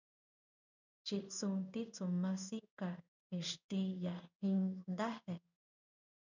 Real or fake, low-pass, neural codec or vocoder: fake; 7.2 kHz; codec, 16 kHz in and 24 kHz out, 1 kbps, XY-Tokenizer